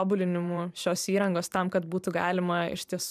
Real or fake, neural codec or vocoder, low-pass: fake; vocoder, 44.1 kHz, 128 mel bands every 512 samples, BigVGAN v2; 14.4 kHz